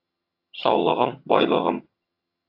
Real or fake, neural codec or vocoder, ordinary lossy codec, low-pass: fake; vocoder, 22.05 kHz, 80 mel bands, HiFi-GAN; AAC, 32 kbps; 5.4 kHz